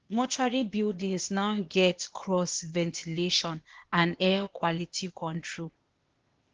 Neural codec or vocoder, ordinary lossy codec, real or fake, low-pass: codec, 16 kHz, 0.8 kbps, ZipCodec; Opus, 16 kbps; fake; 7.2 kHz